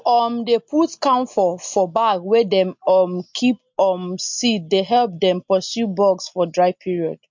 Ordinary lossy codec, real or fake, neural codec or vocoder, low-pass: MP3, 48 kbps; real; none; 7.2 kHz